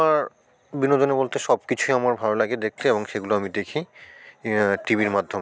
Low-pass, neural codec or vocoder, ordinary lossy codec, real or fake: none; none; none; real